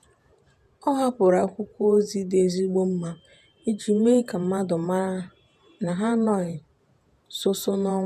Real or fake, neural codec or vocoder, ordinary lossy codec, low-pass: fake; vocoder, 48 kHz, 128 mel bands, Vocos; none; 14.4 kHz